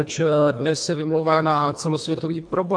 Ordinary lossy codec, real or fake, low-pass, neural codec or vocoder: AAC, 64 kbps; fake; 9.9 kHz; codec, 24 kHz, 1.5 kbps, HILCodec